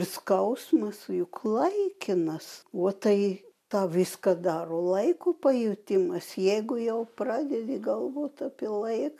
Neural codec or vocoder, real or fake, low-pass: none; real; 14.4 kHz